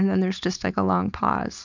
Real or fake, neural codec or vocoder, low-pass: fake; codec, 16 kHz, 4.8 kbps, FACodec; 7.2 kHz